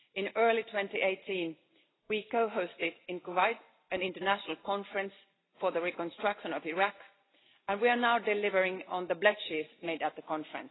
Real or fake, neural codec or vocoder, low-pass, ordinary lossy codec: real; none; 7.2 kHz; AAC, 16 kbps